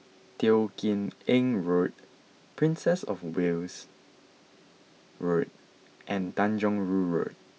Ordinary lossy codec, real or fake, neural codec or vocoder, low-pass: none; real; none; none